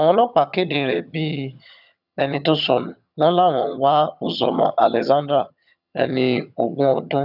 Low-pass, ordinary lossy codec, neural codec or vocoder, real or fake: 5.4 kHz; none; vocoder, 22.05 kHz, 80 mel bands, HiFi-GAN; fake